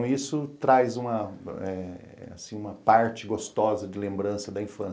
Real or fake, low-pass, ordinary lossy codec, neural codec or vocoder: real; none; none; none